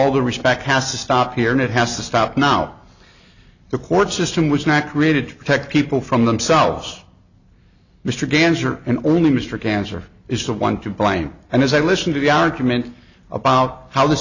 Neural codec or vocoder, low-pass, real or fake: none; 7.2 kHz; real